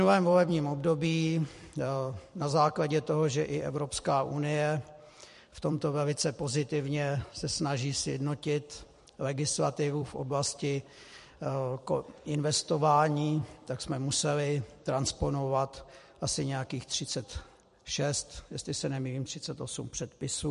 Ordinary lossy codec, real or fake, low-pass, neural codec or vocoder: MP3, 48 kbps; fake; 14.4 kHz; vocoder, 44.1 kHz, 128 mel bands every 256 samples, BigVGAN v2